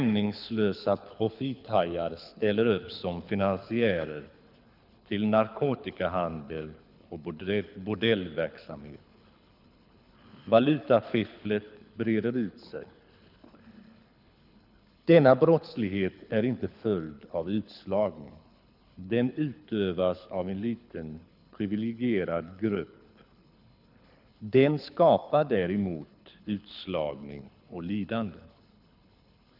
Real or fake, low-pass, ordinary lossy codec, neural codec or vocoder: fake; 5.4 kHz; none; codec, 24 kHz, 6 kbps, HILCodec